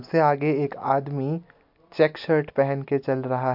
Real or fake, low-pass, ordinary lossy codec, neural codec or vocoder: real; 5.4 kHz; MP3, 48 kbps; none